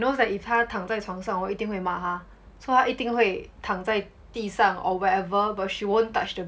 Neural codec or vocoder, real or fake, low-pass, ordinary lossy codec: none; real; none; none